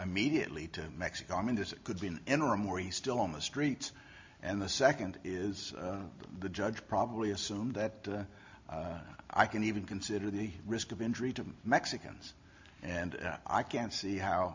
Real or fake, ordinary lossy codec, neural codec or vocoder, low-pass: real; MP3, 48 kbps; none; 7.2 kHz